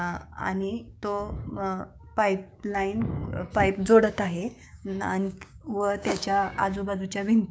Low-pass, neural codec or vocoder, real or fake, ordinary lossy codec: none; codec, 16 kHz, 6 kbps, DAC; fake; none